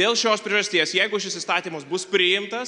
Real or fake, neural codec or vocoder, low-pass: real; none; 10.8 kHz